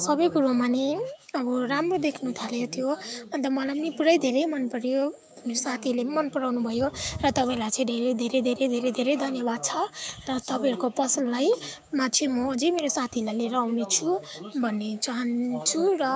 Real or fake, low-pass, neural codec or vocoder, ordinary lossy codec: fake; none; codec, 16 kHz, 6 kbps, DAC; none